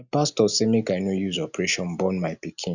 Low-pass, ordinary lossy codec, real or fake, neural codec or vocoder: 7.2 kHz; none; real; none